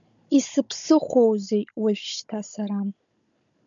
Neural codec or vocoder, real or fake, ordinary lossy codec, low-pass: codec, 16 kHz, 16 kbps, FunCodec, trained on Chinese and English, 50 frames a second; fake; MP3, 96 kbps; 7.2 kHz